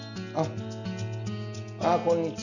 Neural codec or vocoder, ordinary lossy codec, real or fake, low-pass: none; none; real; 7.2 kHz